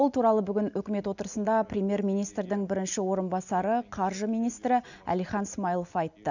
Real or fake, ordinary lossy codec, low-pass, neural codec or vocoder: real; none; 7.2 kHz; none